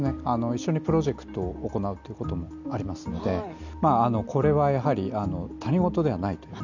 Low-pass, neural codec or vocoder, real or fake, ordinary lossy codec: 7.2 kHz; none; real; none